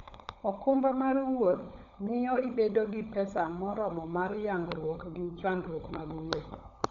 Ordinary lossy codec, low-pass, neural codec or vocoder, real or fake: none; 7.2 kHz; codec, 16 kHz, 16 kbps, FunCodec, trained on LibriTTS, 50 frames a second; fake